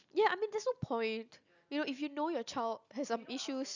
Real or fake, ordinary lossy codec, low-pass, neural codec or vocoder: real; none; 7.2 kHz; none